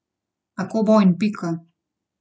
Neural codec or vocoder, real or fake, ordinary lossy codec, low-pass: none; real; none; none